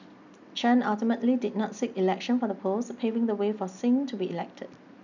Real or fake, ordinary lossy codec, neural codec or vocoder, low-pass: real; none; none; 7.2 kHz